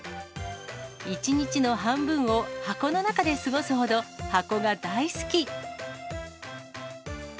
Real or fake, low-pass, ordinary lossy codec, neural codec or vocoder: real; none; none; none